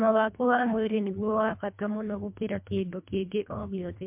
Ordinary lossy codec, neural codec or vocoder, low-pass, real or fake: MP3, 32 kbps; codec, 24 kHz, 1.5 kbps, HILCodec; 3.6 kHz; fake